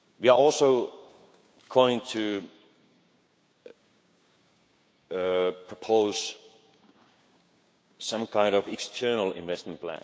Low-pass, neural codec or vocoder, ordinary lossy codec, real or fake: none; codec, 16 kHz, 6 kbps, DAC; none; fake